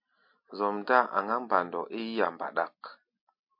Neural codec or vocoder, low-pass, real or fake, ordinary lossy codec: none; 5.4 kHz; real; MP3, 32 kbps